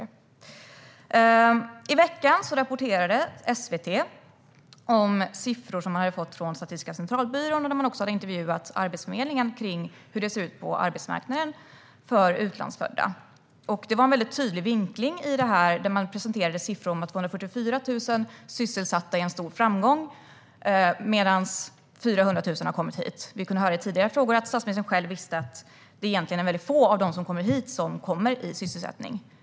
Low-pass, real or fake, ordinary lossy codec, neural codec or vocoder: none; real; none; none